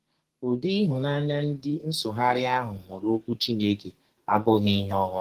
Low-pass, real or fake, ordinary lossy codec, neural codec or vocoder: 14.4 kHz; fake; Opus, 16 kbps; codec, 32 kHz, 1.9 kbps, SNAC